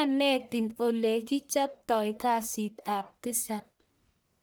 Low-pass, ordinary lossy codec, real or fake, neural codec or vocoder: none; none; fake; codec, 44.1 kHz, 1.7 kbps, Pupu-Codec